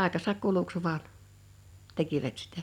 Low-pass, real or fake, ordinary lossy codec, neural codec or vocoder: 19.8 kHz; real; none; none